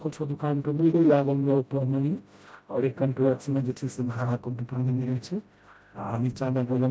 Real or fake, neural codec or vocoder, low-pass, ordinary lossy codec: fake; codec, 16 kHz, 0.5 kbps, FreqCodec, smaller model; none; none